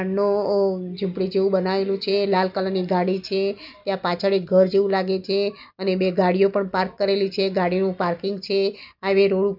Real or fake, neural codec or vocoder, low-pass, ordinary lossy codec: real; none; 5.4 kHz; none